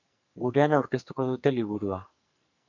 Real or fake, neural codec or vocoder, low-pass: fake; codec, 44.1 kHz, 2.6 kbps, SNAC; 7.2 kHz